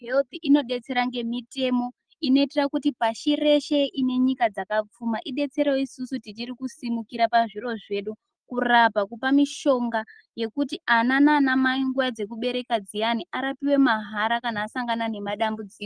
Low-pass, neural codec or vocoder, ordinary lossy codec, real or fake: 9.9 kHz; none; Opus, 24 kbps; real